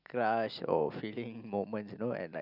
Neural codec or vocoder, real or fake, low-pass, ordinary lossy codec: none; real; 5.4 kHz; none